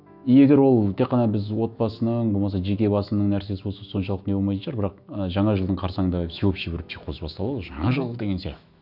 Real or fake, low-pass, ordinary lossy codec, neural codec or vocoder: fake; 5.4 kHz; none; autoencoder, 48 kHz, 128 numbers a frame, DAC-VAE, trained on Japanese speech